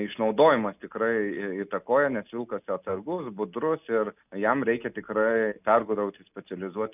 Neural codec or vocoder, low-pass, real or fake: none; 3.6 kHz; real